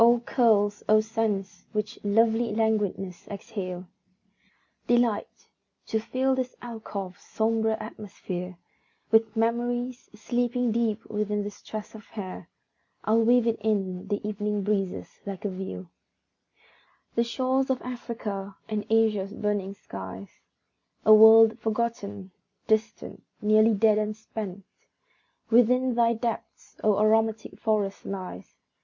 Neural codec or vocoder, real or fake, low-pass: none; real; 7.2 kHz